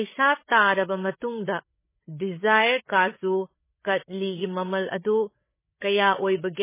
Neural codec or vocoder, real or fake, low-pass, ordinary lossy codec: codec, 16 kHz, 8 kbps, FreqCodec, larger model; fake; 3.6 kHz; MP3, 16 kbps